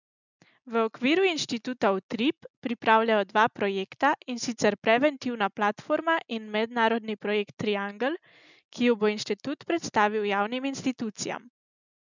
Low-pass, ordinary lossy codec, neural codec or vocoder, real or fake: 7.2 kHz; none; none; real